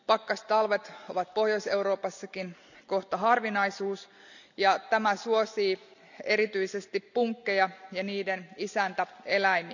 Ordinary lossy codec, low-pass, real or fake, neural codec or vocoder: none; 7.2 kHz; real; none